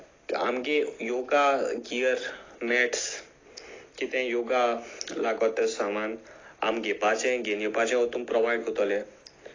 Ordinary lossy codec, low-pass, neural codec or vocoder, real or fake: AAC, 32 kbps; 7.2 kHz; none; real